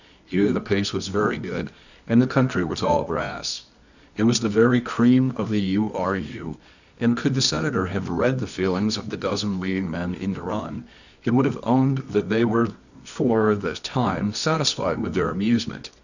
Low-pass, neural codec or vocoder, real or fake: 7.2 kHz; codec, 24 kHz, 0.9 kbps, WavTokenizer, medium music audio release; fake